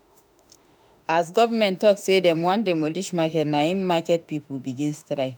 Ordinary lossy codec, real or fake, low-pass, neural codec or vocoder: none; fake; none; autoencoder, 48 kHz, 32 numbers a frame, DAC-VAE, trained on Japanese speech